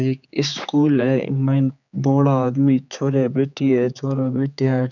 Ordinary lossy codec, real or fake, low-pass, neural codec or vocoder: none; fake; 7.2 kHz; codec, 16 kHz, 4 kbps, X-Codec, HuBERT features, trained on general audio